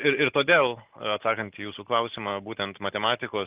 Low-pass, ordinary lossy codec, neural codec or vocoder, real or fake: 3.6 kHz; Opus, 32 kbps; none; real